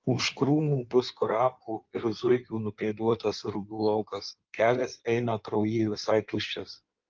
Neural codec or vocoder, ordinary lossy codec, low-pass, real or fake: codec, 16 kHz in and 24 kHz out, 1.1 kbps, FireRedTTS-2 codec; Opus, 32 kbps; 7.2 kHz; fake